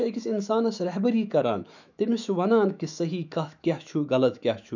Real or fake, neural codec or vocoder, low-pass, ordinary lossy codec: fake; autoencoder, 48 kHz, 128 numbers a frame, DAC-VAE, trained on Japanese speech; 7.2 kHz; none